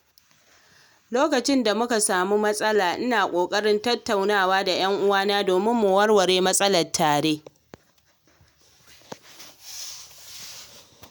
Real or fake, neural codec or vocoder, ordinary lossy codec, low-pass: real; none; none; none